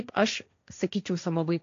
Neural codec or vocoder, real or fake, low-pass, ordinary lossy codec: codec, 16 kHz, 1.1 kbps, Voila-Tokenizer; fake; 7.2 kHz; AAC, 48 kbps